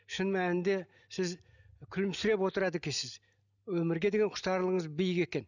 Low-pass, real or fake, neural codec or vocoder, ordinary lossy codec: 7.2 kHz; real; none; none